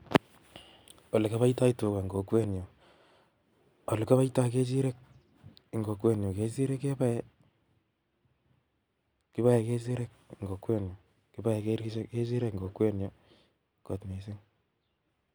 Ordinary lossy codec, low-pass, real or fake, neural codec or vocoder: none; none; real; none